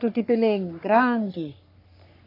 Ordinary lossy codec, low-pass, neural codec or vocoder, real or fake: AAC, 32 kbps; 5.4 kHz; codec, 44.1 kHz, 3.4 kbps, Pupu-Codec; fake